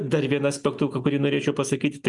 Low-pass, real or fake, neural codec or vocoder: 10.8 kHz; real; none